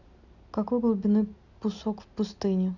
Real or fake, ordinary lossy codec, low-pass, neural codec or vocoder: real; none; 7.2 kHz; none